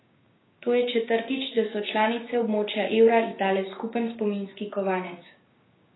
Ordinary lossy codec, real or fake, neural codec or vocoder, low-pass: AAC, 16 kbps; fake; codec, 16 kHz, 6 kbps, DAC; 7.2 kHz